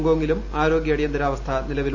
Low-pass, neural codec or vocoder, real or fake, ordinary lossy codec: 7.2 kHz; none; real; none